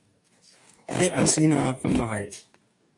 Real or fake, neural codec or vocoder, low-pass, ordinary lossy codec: fake; codec, 44.1 kHz, 2.6 kbps, DAC; 10.8 kHz; MP3, 64 kbps